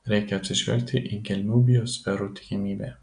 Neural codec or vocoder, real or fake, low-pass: none; real; 9.9 kHz